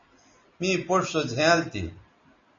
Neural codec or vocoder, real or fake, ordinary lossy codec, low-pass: none; real; MP3, 32 kbps; 7.2 kHz